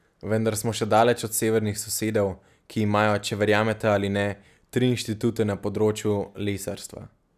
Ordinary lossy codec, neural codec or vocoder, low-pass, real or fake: none; none; 14.4 kHz; real